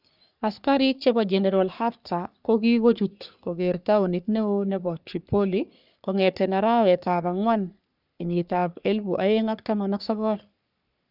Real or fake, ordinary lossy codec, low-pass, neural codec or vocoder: fake; none; 5.4 kHz; codec, 44.1 kHz, 3.4 kbps, Pupu-Codec